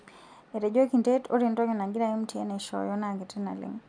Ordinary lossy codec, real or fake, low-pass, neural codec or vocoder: none; real; 9.9 kHz; none